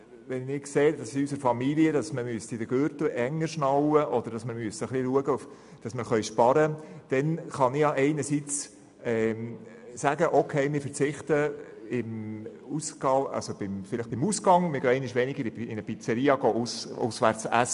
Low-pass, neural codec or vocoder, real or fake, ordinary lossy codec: 10.8 kHz; none; real; none